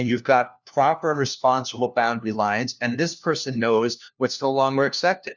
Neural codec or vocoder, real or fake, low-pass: codec, 16 kHz, 1 kbps, FunCodec, trained on LibriTTS, 50 frames a second; fake; 7.2 kHz